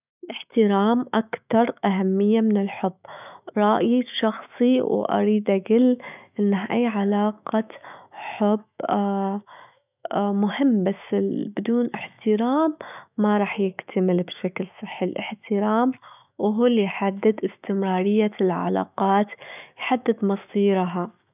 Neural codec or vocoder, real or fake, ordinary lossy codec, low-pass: autoencoder, 48 kHz, 128 numbers a frame, DAC-VAE, trained on Japanese speech; fake; none; 3.6 kHz